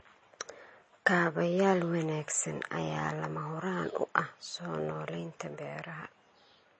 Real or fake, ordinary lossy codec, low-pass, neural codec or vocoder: real; MP3, 32 kbps; 10.8 kHz; none